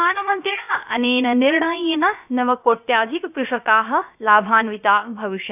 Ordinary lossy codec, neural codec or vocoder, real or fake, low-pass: none; codec, 16 kHz, about 1 kbps, DyCAST, with the encoder's durations; fake; 3.6 kHz